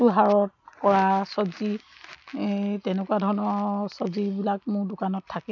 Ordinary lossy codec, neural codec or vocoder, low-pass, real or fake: none; none; 7.2 kHz; real